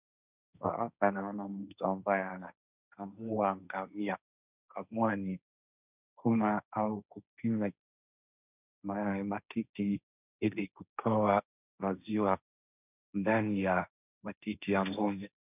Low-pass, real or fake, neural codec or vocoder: 3.6 kHz; fake; codec, 16 kHz, 1.1 kbps, Voila-Tokenizer